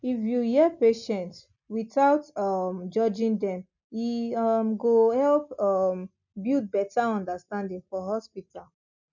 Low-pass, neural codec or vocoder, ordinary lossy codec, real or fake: 7.2 kHz; none; none; real